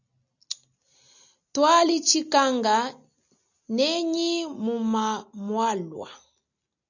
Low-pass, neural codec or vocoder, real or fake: 7.2 kHz; none; real